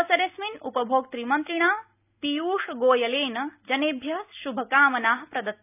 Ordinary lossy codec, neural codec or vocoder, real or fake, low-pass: none; none; real; 3.6 kHz